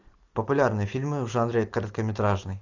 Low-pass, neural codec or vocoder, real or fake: 7.2 kHz; none; real